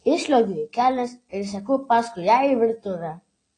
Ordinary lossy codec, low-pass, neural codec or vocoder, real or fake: AAC, 32 kbps; 9.9 kHz; none; real